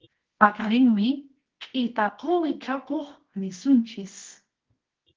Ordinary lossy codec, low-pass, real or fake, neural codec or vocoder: Opus, 16 kbps; 7.2 kHz; fake; codec, 24 kHz, 0.9 kbps, WavTokenizer, medium music audio release